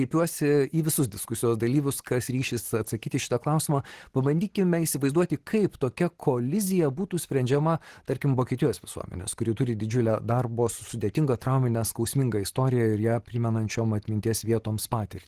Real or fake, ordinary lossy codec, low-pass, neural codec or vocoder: real; Opus, 16 kbps; 14.4 kHz; none